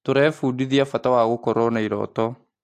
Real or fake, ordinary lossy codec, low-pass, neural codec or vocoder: real; AAC, 64 kbps; 14.4 kHz; none